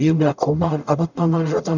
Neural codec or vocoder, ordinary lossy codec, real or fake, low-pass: codec, 44.1 kHz, 0.9 kbps, DAC; none; fake; 7.2 kHz